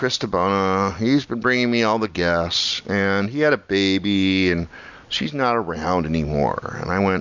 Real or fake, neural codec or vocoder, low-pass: real; none; 7.2 kHz